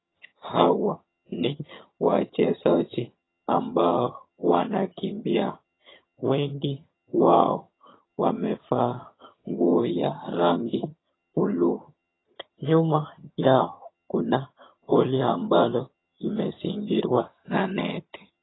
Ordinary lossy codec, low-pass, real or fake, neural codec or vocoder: AAC, 16 kbps; 7.2 kHz; fake; vocoder, 22.05 kHz, 80 mel bands, HiFi-GAN